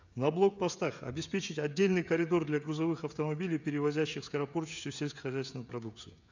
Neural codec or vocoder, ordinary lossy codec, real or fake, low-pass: codec, 16 kHz, 16 kbps, FreqCodec, smaller model; none; fake; 7.2 kHz